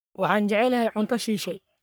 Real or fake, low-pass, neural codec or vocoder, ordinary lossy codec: fake; none; codec, 44.1 kHz, 3.4 kbps, Pupu-Codec; none